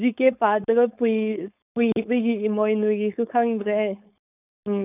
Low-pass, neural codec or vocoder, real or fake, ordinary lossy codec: 3.6 kHz; codec, 16 kHz, 4.8 kbps, FACodec; fake; none